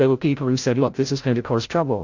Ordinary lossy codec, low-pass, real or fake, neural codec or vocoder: AAC, 48 kbps; 7.2 kHz; fake; codec, 16 kHz, 0.5 kbps, FreqCodec, larger model